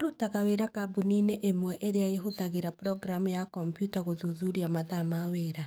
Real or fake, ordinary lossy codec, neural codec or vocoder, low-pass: fake; none; codec, 44.1 kHz, 7.8 kbps, DAC; none